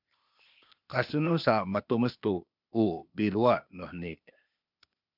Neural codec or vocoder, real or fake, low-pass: codec, 16 kHz, 0.8 kbps, ZipCodec; fake; 5.4 kHz